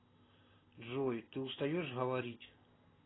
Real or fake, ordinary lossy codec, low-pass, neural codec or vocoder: real; AAC, 16 kbps; 7.2 kHz; none